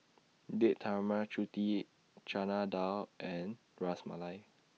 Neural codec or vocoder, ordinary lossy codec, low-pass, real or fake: none; none; none; real